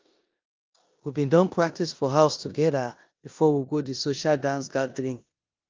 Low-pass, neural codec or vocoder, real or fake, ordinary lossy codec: 7.2 kHz; codec, 16 kHz in and 24 kHz out, 0.9 kbps, LongCat-Audio-Codec, four codebook decoder; fake; Opus, 24 kbps